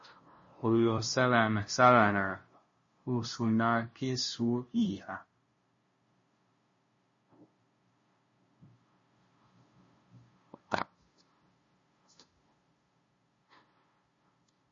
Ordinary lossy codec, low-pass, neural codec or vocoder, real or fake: MP3, 32 kbps; 7.2 kHz; codec, 16 kHz, 0.5 kbps, FunCodec, trained on LibriTTS, 25 frames a second; fake